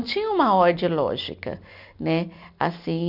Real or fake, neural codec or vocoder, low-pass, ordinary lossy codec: real; none; 5.4 kHz; none